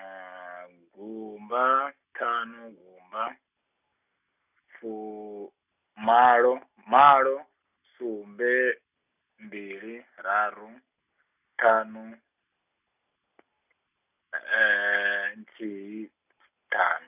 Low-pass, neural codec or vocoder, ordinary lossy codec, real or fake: 3.6 kHz; none; none; real